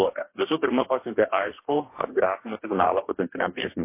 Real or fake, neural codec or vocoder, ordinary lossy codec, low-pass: fake; codec, 44.1 kHz, 2.6 kbps, DAC; MP3, 24 kbps; 3.6 kHz